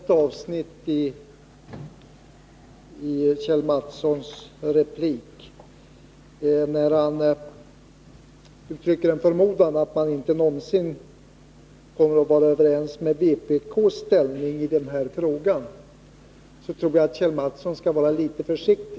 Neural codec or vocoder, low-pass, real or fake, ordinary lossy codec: none; none; real; none